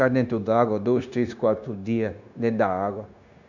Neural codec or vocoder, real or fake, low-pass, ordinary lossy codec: codec, 16 kHz, 0.9 kbps, LongCat-Audio-Codec; fake; 7.2 kHz; none